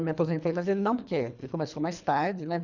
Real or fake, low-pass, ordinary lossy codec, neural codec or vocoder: fake; 7.2 kHz; none; codec, 24 kHz, 3 kbps, HILCodec